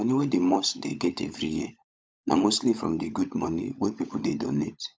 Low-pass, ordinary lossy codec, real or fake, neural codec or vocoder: none; none; fake; codec, 16 kHz, 16 kbps, FunCodec, trained on LibriTTS, 50 frames a second